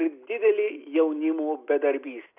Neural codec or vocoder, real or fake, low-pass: none; real; 3.6 kHz